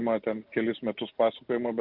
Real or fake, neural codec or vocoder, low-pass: real; none; 5.4 kHz